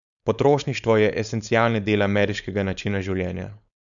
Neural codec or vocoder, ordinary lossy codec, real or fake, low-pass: codec, 16 kHz, 4.8 kbps, FACodec; none; fake; 7.2 kHz